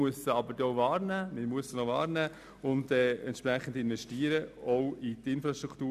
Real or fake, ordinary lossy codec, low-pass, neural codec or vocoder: real; none; 14.4 kHz; none